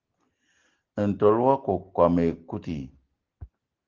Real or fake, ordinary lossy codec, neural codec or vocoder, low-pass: real; Opus, 16 kbps; none; 7.2 kHz